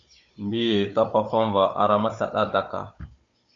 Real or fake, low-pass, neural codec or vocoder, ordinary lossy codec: fake; 7.2 kHz; codec, 16 kHz, 16 kbps, FunCodec, trained on Chinese and English, 50 frames a second; MP3, 48 kbps